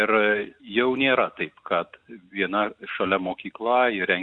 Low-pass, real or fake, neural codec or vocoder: 9.9 kHz; real; none